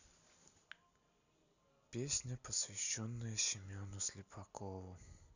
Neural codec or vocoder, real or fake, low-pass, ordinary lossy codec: none; real; 7.2 kHz; none